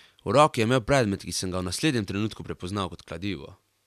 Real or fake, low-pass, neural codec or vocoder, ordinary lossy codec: real; 14.4 kHz; none; none